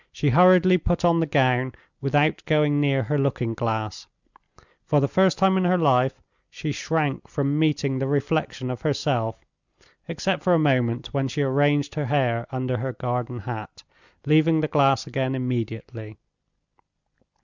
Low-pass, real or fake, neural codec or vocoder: 7.2 kHz; real; none